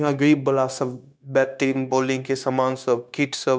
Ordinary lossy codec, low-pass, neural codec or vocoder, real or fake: none; none; codec, 16 kHz, 0.9 kbps, LongCat-Audio-Codec; fake